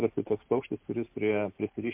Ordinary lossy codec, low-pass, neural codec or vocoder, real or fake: MP3, 32 kbps; 3.6 kHz; none; real